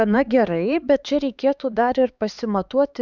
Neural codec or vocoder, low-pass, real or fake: codec, 16 kHz, 4 kbps, X-Codec, HuBERT features, trained on LibriSpeech; 7.2 kHz; fake